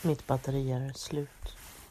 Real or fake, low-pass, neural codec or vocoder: real; 14.4 kHz; none